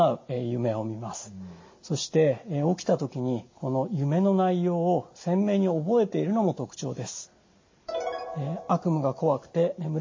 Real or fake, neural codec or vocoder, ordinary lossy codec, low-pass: real; none; MP3, 32 kbps; 7.2 kHz